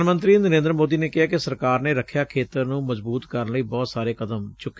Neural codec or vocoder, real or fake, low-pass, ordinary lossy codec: none; real; none; none